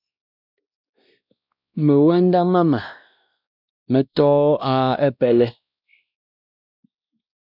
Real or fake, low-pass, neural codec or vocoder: fake; 5.4 kHz; codec, 16 kHz, 1 kbps, X-Codec, WavLM features, trained on Multilingual LibriSpeech